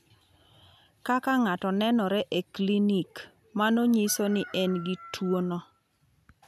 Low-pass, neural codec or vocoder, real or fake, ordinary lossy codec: 14.4 kHz; none; real; none